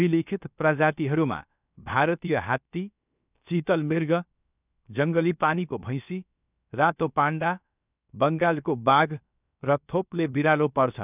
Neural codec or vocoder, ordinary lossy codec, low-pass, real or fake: codec, 16 kHz, 0.7 kbps, FocalCodec; none; 3.6 kHz; fake